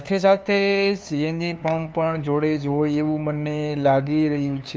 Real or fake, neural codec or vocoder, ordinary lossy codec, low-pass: fake; codec, 16 kHz, 2 kbps, FunCodec, trained on LibriTTS, 25 frames a second; none; none